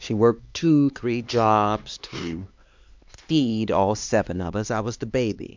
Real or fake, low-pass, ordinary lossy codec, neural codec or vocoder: fake; 7.2 kHz; MP3, 64 kbps; codec, 16 kHz, 2 kbps, X-Codec, HuBERT features, trained on LibriSpeech